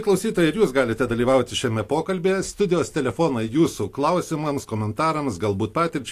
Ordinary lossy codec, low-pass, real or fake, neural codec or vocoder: AAC, 64 kbps; 14.4 kHz; real; none